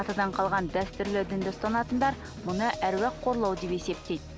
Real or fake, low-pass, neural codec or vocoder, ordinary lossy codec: real; none; none; none